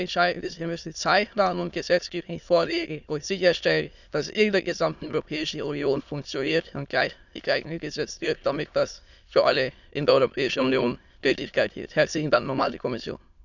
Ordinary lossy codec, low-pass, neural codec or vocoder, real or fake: none; 7.2 kHz; autoencoder, 22.05 kHz, a latent of 192 numbers a frame, VITS, trained on many speakers; fake